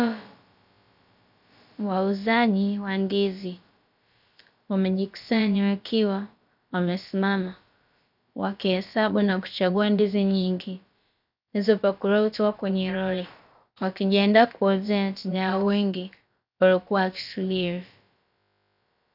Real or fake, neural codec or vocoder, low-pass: fake; codec, 16 kHz, about 1 kbps, DyCAST, with the encoder's durations; 5.4 kHz